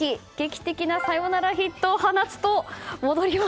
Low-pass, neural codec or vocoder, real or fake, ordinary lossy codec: none; none; real; none